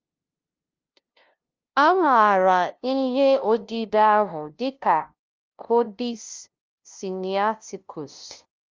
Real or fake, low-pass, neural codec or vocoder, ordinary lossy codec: fake; 7.2 kHz; codec, 16 kHz, 0.5 kbps, FunCodec, trained on LibriTTS, 25 frames a second; Opus, 32 kbps